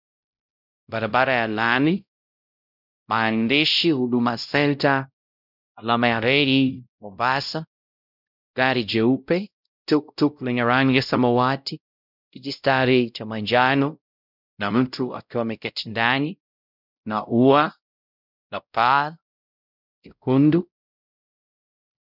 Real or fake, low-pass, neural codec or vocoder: fake; 5.4 kHz; codec, 16 kHz, 0.5 kbps, X-Codec, WavLM features, trained on Multilingual LibriSpeech